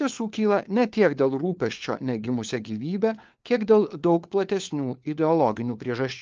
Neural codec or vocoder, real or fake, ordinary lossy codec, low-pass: codec, 16 kHz, 4 kbps, FunCodec, trained on LibriTTS, 50 frames a second; fake; Opus, 24 kbps; 7.2 kHz